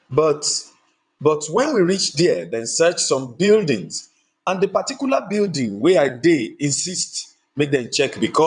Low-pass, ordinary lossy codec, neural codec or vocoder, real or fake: 9.9 kHz; none; vocoder, 22.05 kHz, 80 mel bands, WaveNeXt; fake